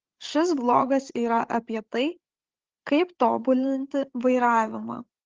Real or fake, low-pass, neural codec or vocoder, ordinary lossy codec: fake; 7.2 kHz; codec, 16 kHz, 8 kbps, FreqCodec, larger model; Opus, 16 kbps